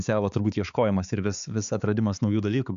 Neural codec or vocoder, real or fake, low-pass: codec, 16 kHz, 4 kbps, X-Codec, HuBERT features, trained on balanced general audio; fake; 7.2 kHz